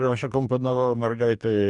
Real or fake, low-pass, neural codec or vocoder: fake; 10.8 kHz; codec, 44.1 kHz, 2.6 kbps, DAC